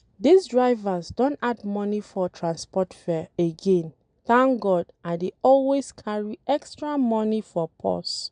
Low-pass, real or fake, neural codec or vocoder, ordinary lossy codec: 9.9 kHz; real; none; none